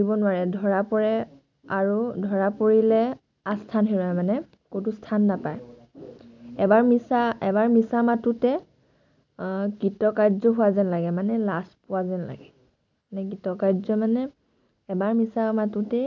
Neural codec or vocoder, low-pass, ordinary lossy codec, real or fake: none; 7.2 kHz; none; real